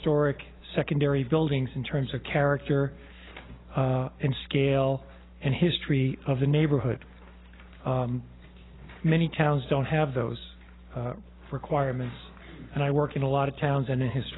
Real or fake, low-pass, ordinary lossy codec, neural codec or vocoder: real; 7.2 kHz; AAC, 16 kbps; none